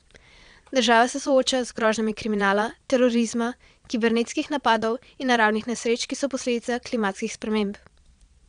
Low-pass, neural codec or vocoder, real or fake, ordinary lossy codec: 9.9 kHz; vocoder, 22.05 kHz, 80 mel bands, Vocos; fake; none